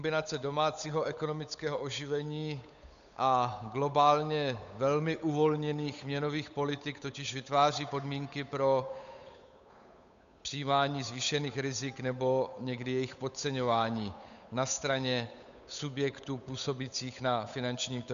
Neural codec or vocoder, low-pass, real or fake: codec, 16 kHz, 8 kbps, FunCodec, trained on Chinese and English, 25 frames a second; 7.2 kHz; fake